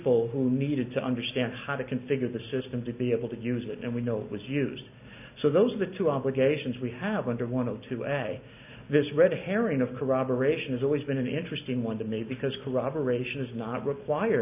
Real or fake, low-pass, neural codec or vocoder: real; 3.6 kHz; none